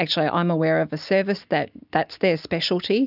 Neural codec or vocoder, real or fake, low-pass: none; real; 5.4 kHz